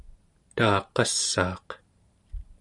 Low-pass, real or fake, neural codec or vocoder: 10.8 kHz; fake; vocoder, 44.1 kHz, 128 mel bands every 512 samples, BigVGAN v2